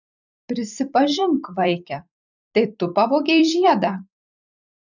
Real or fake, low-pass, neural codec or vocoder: fake; 7.2 kHz; vocoder, 44.1 kHz, 128 mel bands every 256 samples, BigVGAN v2